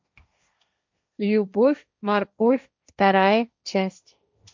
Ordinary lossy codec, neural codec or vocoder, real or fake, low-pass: MP3, 64 kbps; codec, 16 kHz, 1.1 kbps, Voila-Tokenizer; fake; 7.2 kHz